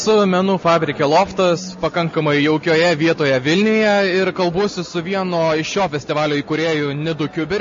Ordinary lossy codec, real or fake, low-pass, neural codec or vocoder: MP3, 32 kbps; real; 7.2 kHz; none